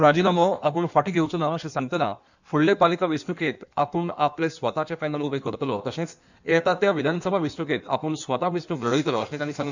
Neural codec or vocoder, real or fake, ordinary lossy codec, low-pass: codec, 16 kHz in and 24 kHz out, 1.1 kbps, FireRedTTS-2 codec; fake; none; 7.2 kHz